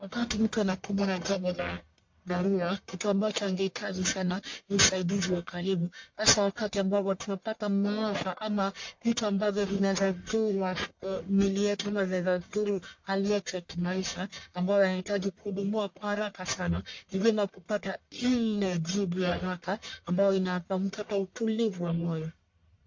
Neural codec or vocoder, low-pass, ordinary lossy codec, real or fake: codec, 44.1 kHz, 1.7 kbps, Pupu-Codec; 7.2 kHz; MP3, 48 kbps; fake